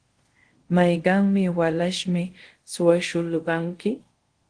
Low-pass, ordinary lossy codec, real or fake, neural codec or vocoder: 9.9 kHz; Opus, 16 kbps; fake; codec, 24 kHz, 0.5 kbps, DualCodec